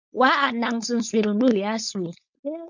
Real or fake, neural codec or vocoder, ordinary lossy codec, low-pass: fake; codec, 16 kHz, 4.8 kbps, FACodec; MP3, 64 kbps; 7.2 kHz